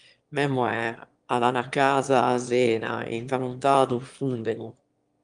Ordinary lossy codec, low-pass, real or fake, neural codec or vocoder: Opus, 32 kbps; 9.9 kHz; fake; autoencoder, 22.05 kHz, a latent of 192 numbers a frame, VITS, trained on one speaker